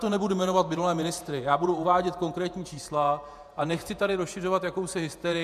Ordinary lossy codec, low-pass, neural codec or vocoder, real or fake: MP3, 96 kbps; 14.4 kHz; vocoder, 48 kHz, 128 mel bands, Vocos; fake